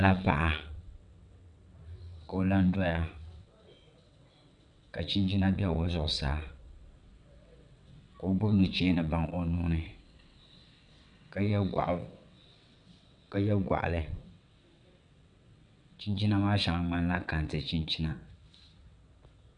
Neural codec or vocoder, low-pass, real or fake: vocoder, 22.05 kHz, 80 mel bands, WaveNeXt; 9.9 kHz; fake